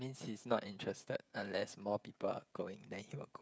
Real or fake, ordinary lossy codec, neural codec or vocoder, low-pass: fake; none; codec, 16 kHz, 16 kbps, FreqCodec, smaller model; none